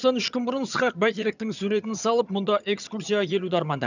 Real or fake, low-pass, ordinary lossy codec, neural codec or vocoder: fake; 7.2 kHz; none; vocoder, 22.05 kHz, 80 mel bands, HiFi-GAN